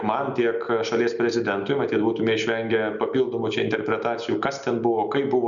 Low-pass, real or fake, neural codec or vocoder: 7.2 kHz; real; none